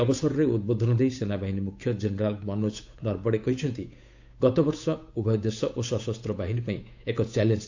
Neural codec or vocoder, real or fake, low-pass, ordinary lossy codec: codec, 16 kHz, 8 kbps, FunCodec, trained on Chinese and English, 25 frames a second; fake; 7.2 kHz; AAC, 48 kbps